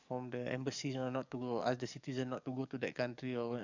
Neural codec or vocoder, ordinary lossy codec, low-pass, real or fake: codec, 44.1 kHz, 7.8 kbps, DAC; none; 7.2 kHz; fake